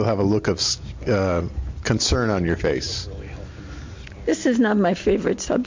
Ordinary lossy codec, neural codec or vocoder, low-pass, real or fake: MP3, 48 kbps; none; 7.2 kHz; real